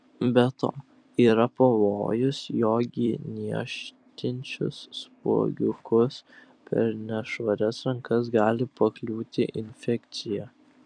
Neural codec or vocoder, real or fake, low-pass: vocoder, 44.1 kHz, 128 mel bands every 256 samples, BigVGAN v2; fake; 9.9 kHz